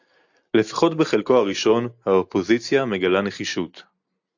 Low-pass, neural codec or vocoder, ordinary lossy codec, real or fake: 7.2 kHz; none; AAC, 48 kbps; real